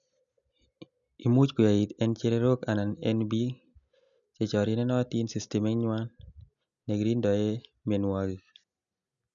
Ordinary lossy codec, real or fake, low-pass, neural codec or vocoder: none; real; 7.2 kHz; none